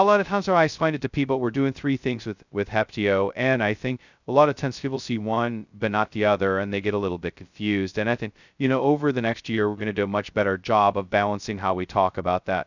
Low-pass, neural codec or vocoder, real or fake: 7.2 kHz; codec, 16 kHz, 0.2 kbps, FocalCodec; fake